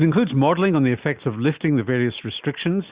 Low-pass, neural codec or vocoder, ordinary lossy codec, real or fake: 3.6 kHz; none; Opus, 64 kbps; real